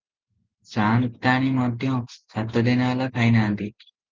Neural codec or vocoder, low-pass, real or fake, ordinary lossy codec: none; 7.2 kHz; real; Opus, 32 kbps